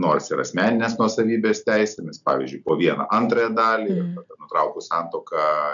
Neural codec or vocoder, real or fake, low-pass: none; real; 7.2 kHz